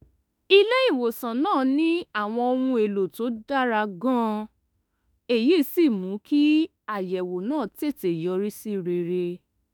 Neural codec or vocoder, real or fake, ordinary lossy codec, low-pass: autoencoder, 48 kHz, 32 numbers a frame, DAC-VAE, trained on Japanese speech; fake; none; none